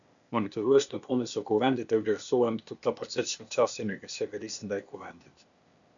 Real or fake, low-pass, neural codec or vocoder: fake; 7.2 kHz; codec, 16 kHz, 0.8 kbps, ZipCodec